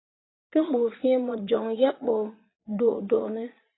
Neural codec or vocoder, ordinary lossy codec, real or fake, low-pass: vocoder, 22.05 kHz, 80 mel bands, Vocos; AAC, 16 kbps; fake; 7.2 kHz